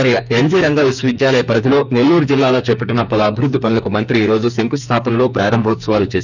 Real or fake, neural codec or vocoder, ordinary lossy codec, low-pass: fake; codec, 44.1 kHz, 2.6 kbps, SNAC; none; 7.2 kHz